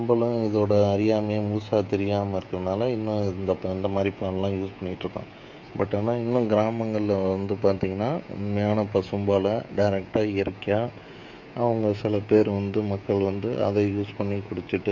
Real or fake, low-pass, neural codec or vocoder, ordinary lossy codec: fake; 7.2 kHz; codec, 16 kHz, 16 kbps, FreqCodec, smaller model; MP3, 48 kbps